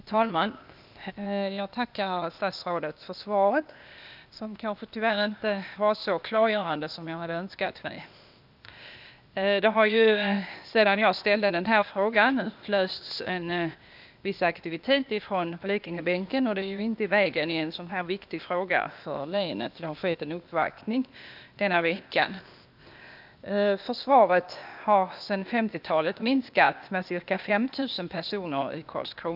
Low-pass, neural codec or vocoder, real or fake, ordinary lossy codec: 5.4 kHz; codec, 16 kHz, 0.8 kbps, ZipCodec; fake; none